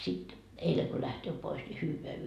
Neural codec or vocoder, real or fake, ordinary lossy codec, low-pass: none; real; none; 14.4 kHz